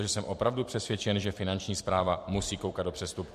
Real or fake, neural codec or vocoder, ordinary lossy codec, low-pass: fake; vocoder, 44.1 kHz, 128 mel bands every 256 samples, BigVGAN v2; MP3, 64 kbps; 14.4 kHz